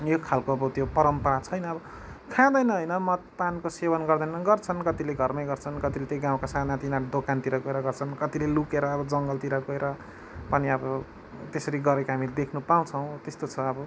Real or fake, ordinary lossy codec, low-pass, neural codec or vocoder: real; none; none; none